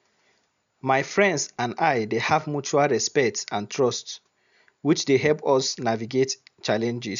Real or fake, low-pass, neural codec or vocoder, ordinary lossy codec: real; 7.2 kHz; none; none